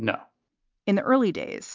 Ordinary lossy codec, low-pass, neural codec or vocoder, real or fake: MP3, 64 kbps; 7.2 kHz; none; real